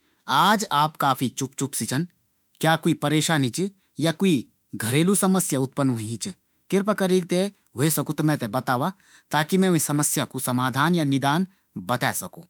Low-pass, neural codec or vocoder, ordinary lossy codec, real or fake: none; autoencoder, 48 kHz, 32 numbers a frame, DAC-VAE, trained on Japanese speech; none; fake